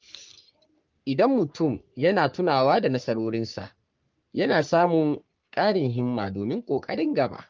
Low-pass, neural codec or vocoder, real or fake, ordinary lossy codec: 7.2 kHz; codec, 44.1 kHz, 3.4 kbps, Pupu-Codec; fake; Opus, 24 kbps